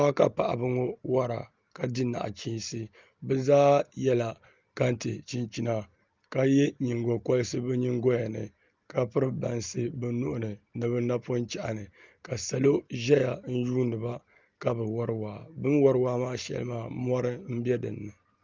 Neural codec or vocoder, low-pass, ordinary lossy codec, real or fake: none; 7.2 kHz; Opus, 32 kbps; real